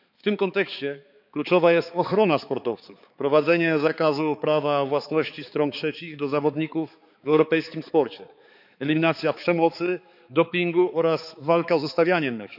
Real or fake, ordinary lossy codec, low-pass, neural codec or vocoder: fake; none; 5.4 kHz; codec, 16 kHz, 4 kbps, X-Codec, HuBERT features, trained on balanced general audio